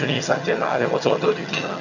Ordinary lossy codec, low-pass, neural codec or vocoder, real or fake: none; 7.2 kHz; vocoder, 22.05 kHz, 80 mel bands, HiFi-GAN; fake